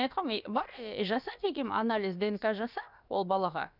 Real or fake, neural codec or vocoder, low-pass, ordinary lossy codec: fake; codec, 16 kHz, about 1 kbps, DyCAST, with the encoder's durations; 5.4 kHz; none